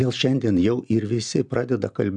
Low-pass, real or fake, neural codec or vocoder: 9.9 kHz; real; none